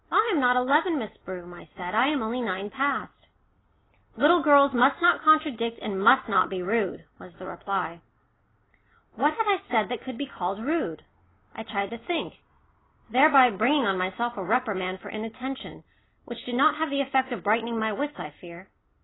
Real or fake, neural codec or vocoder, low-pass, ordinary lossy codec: real; none; 7.2 kHz; AAC, 16 kbps